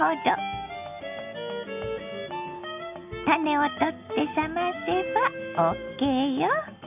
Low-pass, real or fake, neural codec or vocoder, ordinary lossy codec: 3.6 kHz; real; none; none